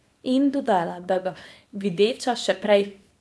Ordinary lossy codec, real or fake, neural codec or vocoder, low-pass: none; fake; codec, 24 kHz, 0.9 kbps, WavTokenizer, small release; none